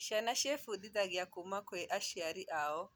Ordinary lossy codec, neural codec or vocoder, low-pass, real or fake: none; none; none; real